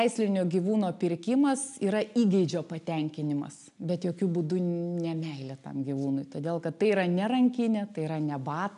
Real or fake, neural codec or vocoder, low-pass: real; none; 10.8 kHz